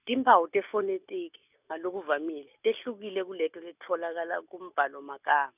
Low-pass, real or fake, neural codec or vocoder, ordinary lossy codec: 3.6 kHz; real; none; none